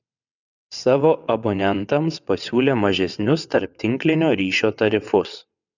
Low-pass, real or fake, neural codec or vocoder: 7.2 kHz; fake; vocoder, 22.05 kHz, 80 mel bands, WaveNeXt